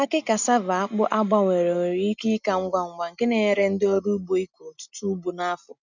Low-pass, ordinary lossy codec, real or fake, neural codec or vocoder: 7.2 kHz; none; real; none